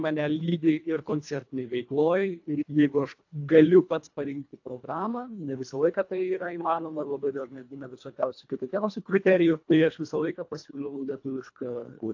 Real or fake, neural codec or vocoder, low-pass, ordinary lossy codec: fake; codec, 24 kHz, 1.5 kbps, HILCodec; 7.2 kHz; AAC, 48 kbps